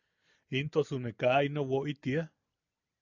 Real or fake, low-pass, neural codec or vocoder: real; 7.2 kHz; none